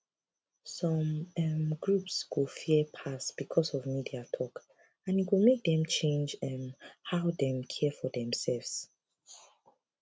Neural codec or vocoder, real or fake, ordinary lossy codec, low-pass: none; real; none; none